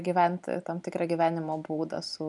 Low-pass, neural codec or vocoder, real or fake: 10.8 kHz; none; real